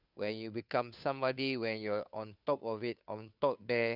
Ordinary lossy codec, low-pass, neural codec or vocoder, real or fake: none; 5.4 kHz; codec, 16 kHz, 2 kbps, FunCodec, trained on Chinese and English, 25 frames a second; fake